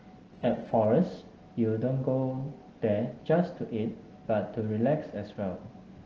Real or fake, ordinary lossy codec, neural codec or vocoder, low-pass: real; Opus, 16 kbps; none; 7.2 kHz